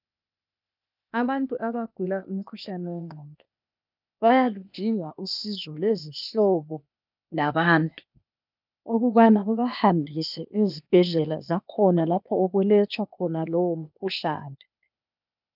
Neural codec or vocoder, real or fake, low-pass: codec, 16 kHz, 0.8 kbps, ZipCodec; fake; 5.4 kHz